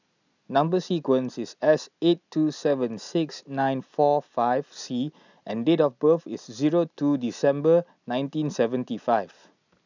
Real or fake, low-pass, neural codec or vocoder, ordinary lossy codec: real; 7.2 kHz; none; none